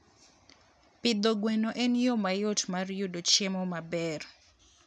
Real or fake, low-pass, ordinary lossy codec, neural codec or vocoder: real; none; none; none